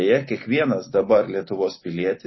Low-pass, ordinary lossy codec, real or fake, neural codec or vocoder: 7.2 kHz; MP3, 24 kbps; real; none